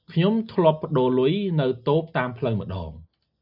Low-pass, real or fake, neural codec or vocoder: 5.4 kHz; real; none